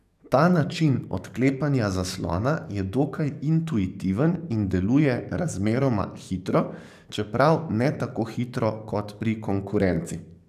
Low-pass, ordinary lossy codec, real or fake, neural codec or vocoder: 14.4 kHz; none; fake; codec, 44.1 kHz, 7.8 kbps, DAC